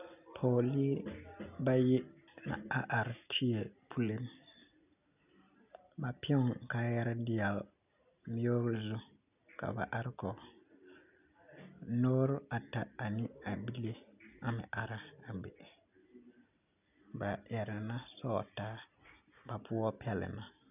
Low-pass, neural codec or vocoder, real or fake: 3.6 kHz; none; real